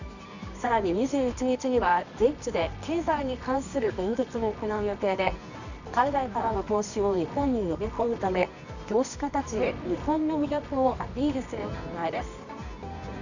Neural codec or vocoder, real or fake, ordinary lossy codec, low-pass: codec, 24 kHz, 0.9 kbps, WavTokenizer, medium music audio release; fake; none; 7.2 kHz